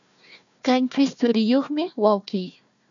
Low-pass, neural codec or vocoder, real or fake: 7.2 kHz; codec, 16 kHz, 1 kbps, FunCodec, trained on Chinese and English, 50 frames a second; fake